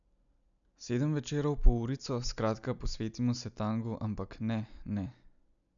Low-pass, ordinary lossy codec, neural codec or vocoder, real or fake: 7.2 kHz; none; none; real